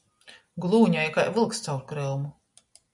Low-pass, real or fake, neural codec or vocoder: 10.8 kHz; real; none